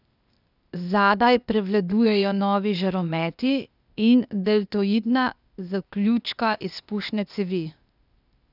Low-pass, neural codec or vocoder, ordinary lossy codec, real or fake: 5.4 kHz; codec, 16 kHz, 0.8 kbps, ZipCodec; none; fake